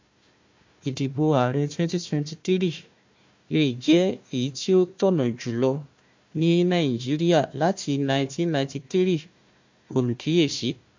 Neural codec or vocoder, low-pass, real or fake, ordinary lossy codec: codec, 16 kHz, 1 kbps, FunCodec, trained on Chinese and English, 50 frames a second; 7.2 kHz; fake; MP3, 48 kbps